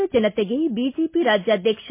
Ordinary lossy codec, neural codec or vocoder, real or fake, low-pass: MP3, 24 kbps; none; real; 3.6 kHz